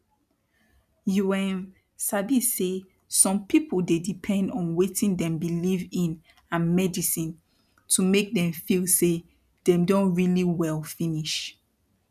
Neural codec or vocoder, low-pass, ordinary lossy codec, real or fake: none; 14.4 kHz; none; real